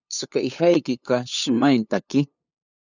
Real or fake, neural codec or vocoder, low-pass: fake; codec, 16 kHz, 8 kbps, FunCodec, trained on LibriTTS, 25 frames a second; 7.2 kHz